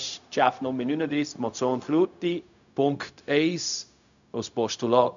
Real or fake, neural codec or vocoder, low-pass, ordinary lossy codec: fake; codec, 16 kHz, 0.4 kbps, LongCat-Audio-Codec; 7.2 kHz; MP3, 96 kbps